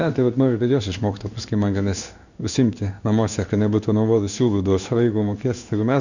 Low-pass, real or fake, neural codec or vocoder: 7.2 kHz; fake; codec, 16 kHz in and 24 kHz out, 1 kbps, XY-Tokenizer